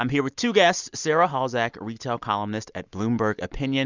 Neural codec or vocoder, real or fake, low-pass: none; real; 7.2 kHz